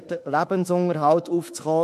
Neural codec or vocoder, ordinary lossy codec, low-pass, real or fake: autoencoder, 48 kHz, 32 numbers a frame, DAC-VAE, trained on Japanese speech; MP3, 64 kbps; 14.4 kHz; fake